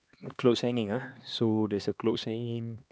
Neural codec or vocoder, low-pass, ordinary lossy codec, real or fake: codec, 16 kHz, 2 kbps, X-Codec, HuBERT features, trained on LibriSpeech; none; none; fake